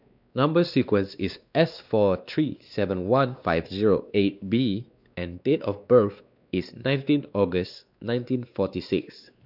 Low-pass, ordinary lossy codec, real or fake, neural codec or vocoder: 5.4 kHz; none; fake; codec, 16 kHz, 2 kbps, X-Codec, WavLM features, trained on Multilingual LibriSpeech